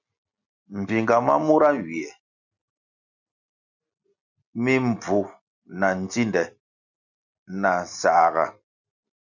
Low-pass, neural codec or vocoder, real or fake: 7.2 kHz; none; real